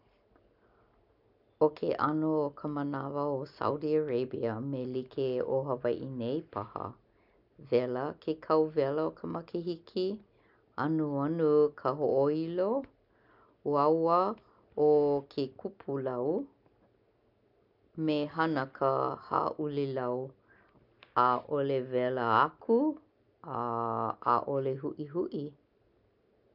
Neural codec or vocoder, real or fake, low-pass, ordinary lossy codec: none; real; 5.4 kHz; none